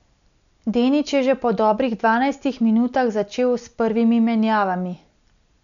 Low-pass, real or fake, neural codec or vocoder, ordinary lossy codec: 7.2 kHz; real; none; none